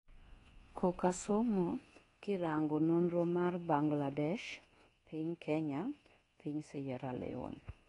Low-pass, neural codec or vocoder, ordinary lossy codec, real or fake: 10.8 kHz; codec, 24 kHz, 1.2 kbps, DualCodec; AAC, 32 kbps; fake